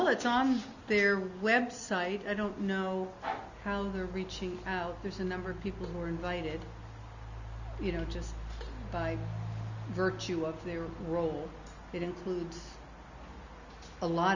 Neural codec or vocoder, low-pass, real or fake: none; 7.2 kHz; real